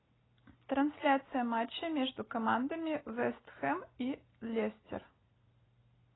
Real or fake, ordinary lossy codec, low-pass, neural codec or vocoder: real; AAC, 16 kbps; 7.2 kHz; none